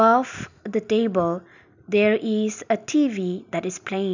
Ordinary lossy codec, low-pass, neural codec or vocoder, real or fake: none; 7.2 kHz; none; real